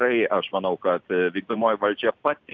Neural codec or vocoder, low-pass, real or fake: none; 7.2 kHz; real